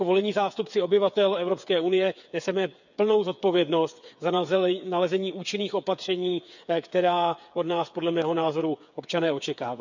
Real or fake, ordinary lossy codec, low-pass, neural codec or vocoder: fake; none; 7.2 kHz; codec, 16 kHz, 8 kbps, FreqCodec, smaller model